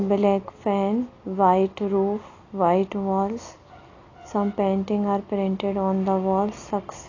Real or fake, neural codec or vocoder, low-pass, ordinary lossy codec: real; none; 7.2 kHz; AAC, 32 kbps